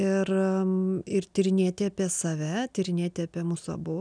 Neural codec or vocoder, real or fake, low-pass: none; real; 9.9 kHz